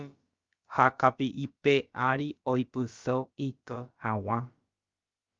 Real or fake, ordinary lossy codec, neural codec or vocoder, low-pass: fake; Opus, 32 kbps; codec, 16 kHz, about 1 kbps, DyCAST, with the encoder's durations; 7.2 kHz